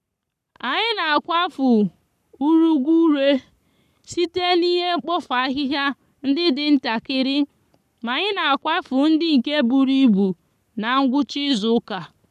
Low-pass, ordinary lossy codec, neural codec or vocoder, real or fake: 14.4 kHz; none; codec, 44.1 kHz, 7.8 kbps, Pupu-Codec; fake